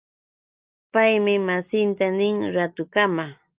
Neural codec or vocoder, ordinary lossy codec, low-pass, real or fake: none; Opus, 24 kbps; 3.6 kHz; real